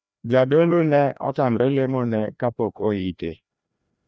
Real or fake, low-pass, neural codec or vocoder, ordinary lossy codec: fake; none; codec, 16 kHz, 1 kbps, FreqCodec, larger model; none